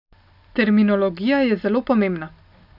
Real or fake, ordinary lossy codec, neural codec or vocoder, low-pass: real; none; none; 5.4 kHz